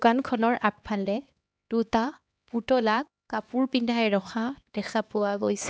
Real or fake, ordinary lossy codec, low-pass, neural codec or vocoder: fake; none; none; codec, 16 kHz, 2 kbps, X-Codec, HuBERT features, trained on LibriSpeech